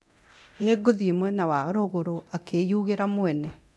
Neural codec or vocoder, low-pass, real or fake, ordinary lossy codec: codec, 24 kHz, 0.9 kbps, DualCodec; none; fake; none